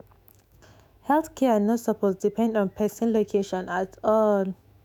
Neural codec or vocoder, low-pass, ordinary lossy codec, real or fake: autoencoder, 48 kHz, 128 numbers a frame, DAC-VAE, trained on Japanese speech; 19.8 kHz; none; fake